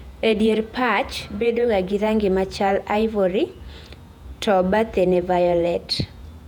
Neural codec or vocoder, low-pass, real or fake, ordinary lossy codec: vocoder, 48 kHz, 128 mel bands, Vocos; 19.8 kHz; fake; none